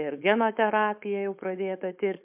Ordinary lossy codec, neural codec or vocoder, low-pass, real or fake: MP3, 32 kbps; codec, 16 kHz, 4.8 kbps, FACodec; 3.6 kHz; fake